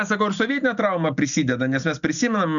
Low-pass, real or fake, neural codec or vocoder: 7.2 kHz; real; none